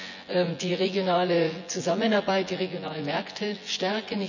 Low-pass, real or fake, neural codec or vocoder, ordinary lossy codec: 7.2 kHz; fake; vocoder, 24 kHz, 100 mel bands, Vocos; none